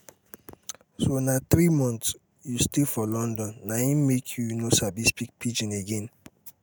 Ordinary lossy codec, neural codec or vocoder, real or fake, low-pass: none; none; real; none